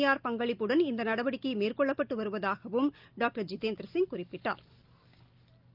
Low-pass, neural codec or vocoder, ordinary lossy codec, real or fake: 5.4 kHz; none; Opus, 24 kbps; real